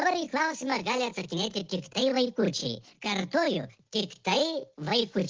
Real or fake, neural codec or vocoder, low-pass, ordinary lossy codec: real; none; 7.2 kHz; Opus, 16 kbps